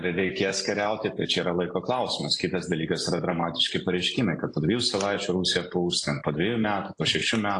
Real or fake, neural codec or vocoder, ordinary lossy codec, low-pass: real; none; AAC, 48 kbps; 10.8 kHz